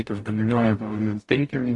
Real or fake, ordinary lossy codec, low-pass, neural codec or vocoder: fake; AAC, 48 kbps; 10.8 kHz; codec, 44.1 kHz, 0.9 kbps, DAC